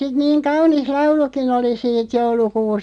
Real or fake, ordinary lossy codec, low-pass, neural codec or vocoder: real; none; 9.9 kHz; none